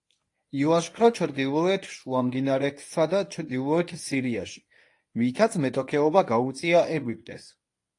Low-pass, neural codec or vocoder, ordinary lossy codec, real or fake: 10.8 kHz; codec, 24 kHz, 0.9 kbps, WavTokenizer, medium speech release version 1; AAC, 48 kbps; fake